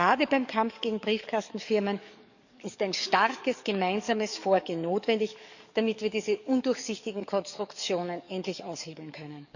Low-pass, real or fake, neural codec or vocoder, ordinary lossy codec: 7.2 kHz; fake; codec, 44.1 kHz, 7.8 kbps, DAC; none